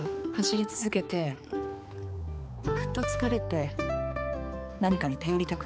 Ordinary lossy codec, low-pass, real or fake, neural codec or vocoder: none; none; fake; codec, 16 kHz, 4 kbps, X-Codec, HuBERT features, trained on balanced general audio